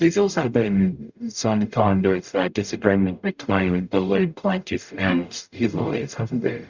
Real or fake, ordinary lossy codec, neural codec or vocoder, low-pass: fake; Opus, 64 kbps; codec, 44.1 kHz, 0.9 kbps, DAC; 7.2 kHz